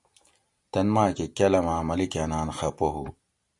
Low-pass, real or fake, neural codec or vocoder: 10.8 kHz; real; none